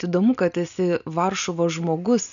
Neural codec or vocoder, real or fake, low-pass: none; real; 7.2 kHz